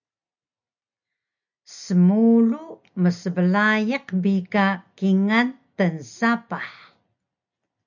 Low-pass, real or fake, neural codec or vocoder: 7.2 kHz; real; none